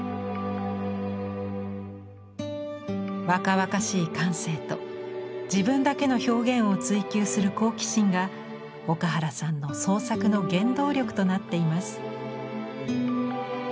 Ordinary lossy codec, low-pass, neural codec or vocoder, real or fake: none; none; none; real